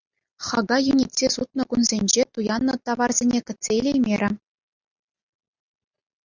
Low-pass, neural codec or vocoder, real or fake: 7.2 kHz; none; real